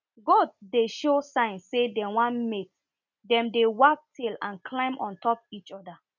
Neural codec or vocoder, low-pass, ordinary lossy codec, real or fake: none; 7.2 kHz; none; real